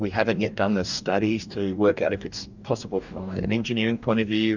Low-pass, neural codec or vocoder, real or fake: 7.2 kHz; codec, 44.1 kHz, 2.6 kbps, DAC; fake